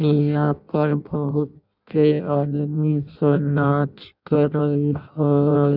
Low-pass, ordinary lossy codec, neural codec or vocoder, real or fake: 5.4 kHz; none; codec, 16 kHz in and 24 kHz out, 0.6 kbps, FireRedTTS-2 codec; fake